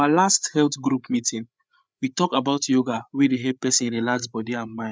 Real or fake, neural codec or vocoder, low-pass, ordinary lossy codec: fake; codec, 16 kHz, 8 kbps, FreqCodec, larger model; none; none